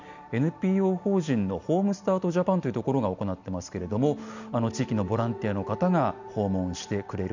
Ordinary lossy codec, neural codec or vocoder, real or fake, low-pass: none; none; real; 7.2 kHz